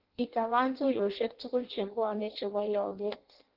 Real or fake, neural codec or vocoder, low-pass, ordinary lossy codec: fake; codec, 16 kHz in and 24 kHz out, 0.6 kbps, FireRedTTS-2 codec; 5.4 kHz; Opus, 16 kbps